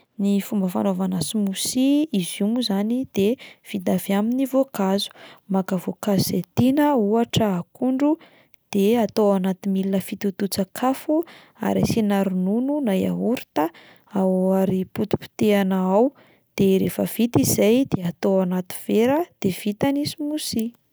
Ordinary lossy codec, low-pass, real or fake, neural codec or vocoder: none; none; real; none